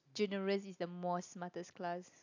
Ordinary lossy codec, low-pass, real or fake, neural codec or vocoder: AAC, 48 kbps; 7.2 kHz; real; none